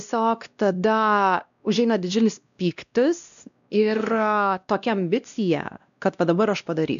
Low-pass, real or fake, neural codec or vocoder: 7.2 kHz; fake; codec, 16 kHz, 1 kbps, X-Codec, WavLM features, trained on Multilingual LibriSpeech